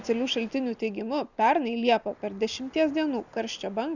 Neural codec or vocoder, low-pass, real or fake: none; 7.2 kHz; real